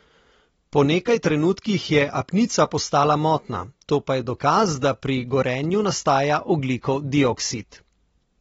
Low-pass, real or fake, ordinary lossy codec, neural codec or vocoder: 19.8 kHz; real; AAC, 24 kbps; none